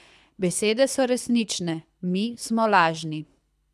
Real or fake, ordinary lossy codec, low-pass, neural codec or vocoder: fake; none; none; codec, 24 kHz, 6 kbps, HILCodec